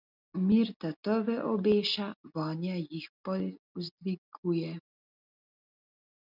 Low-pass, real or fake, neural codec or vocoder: 5.4 kHz; real; none